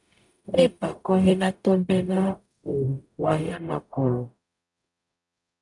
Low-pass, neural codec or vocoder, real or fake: 10.8 kHz; codec, 44.1 kHz, 0.9 kbps, DAC; fake